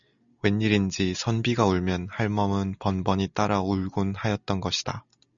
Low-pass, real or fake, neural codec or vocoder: 7.2 kHz; real; none